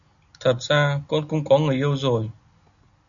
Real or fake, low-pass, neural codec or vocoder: real; 7.2 kHz; none